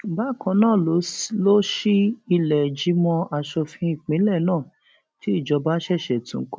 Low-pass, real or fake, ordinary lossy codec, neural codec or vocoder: none; real; none; none